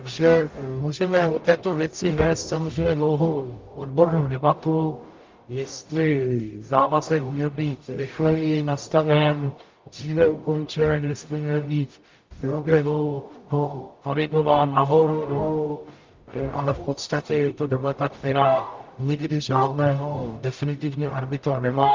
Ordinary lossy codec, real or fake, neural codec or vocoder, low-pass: Opus, 32 kbps; fake; codec, 44.1 kHz, 0.9 kbps, DAC; 7.2 kHz